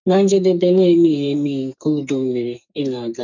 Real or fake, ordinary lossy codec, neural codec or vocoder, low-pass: fake; none; codec, 44.1 kHz, 2.6 kbps, SNAC; 7.2 kHz